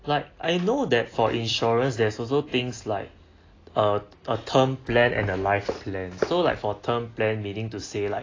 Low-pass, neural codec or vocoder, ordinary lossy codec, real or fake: 7.2 kHz; none; AAC, 32 kbps; real